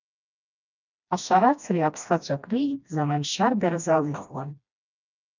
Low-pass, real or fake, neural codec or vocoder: 7.2 kHz; fake; codec, 16 kHz, 1 kbps, FreqCodec, smaller model